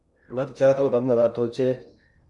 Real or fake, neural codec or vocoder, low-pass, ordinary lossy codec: fake; codec, 16 kHz in and 24 kHz out, 0.6 kbps, FocalCodec, streaming, 2048 codes; 10.8 kHz; MP3, 96 kbps